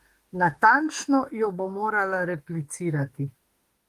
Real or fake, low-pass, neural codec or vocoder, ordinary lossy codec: fake; 14.4 kHz; autoencoder, 48 kHz, 32 numbers a frame, DAC-VAE, trained on Japanese speech; Opus, 24 kbps